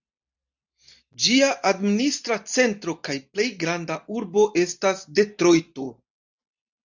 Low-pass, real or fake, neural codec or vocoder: 7.2 kHz; real; none